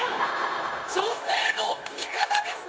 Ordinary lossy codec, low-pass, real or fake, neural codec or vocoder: none; none; fake; codec, 16 kHz, 0.9 kbps, LongCat-Audio-Codec